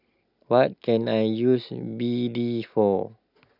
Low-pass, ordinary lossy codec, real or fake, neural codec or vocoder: 5.4 kHz; none; real; none